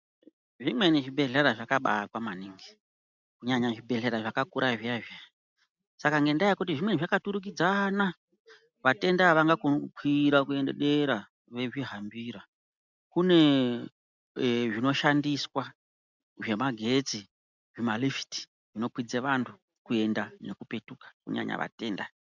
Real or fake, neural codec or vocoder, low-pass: real; none; 7.2 kHz